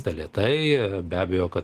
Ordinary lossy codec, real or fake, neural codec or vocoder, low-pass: Opus, 16 kbps; real; none; 14.4 kHz